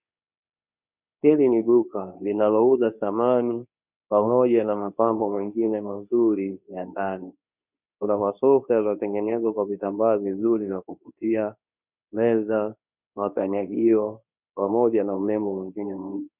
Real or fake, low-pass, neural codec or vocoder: fake; 3.6 kHz; codec, 24 kHz, 0.9 kbps, WavTokenizer, medium speech release version 2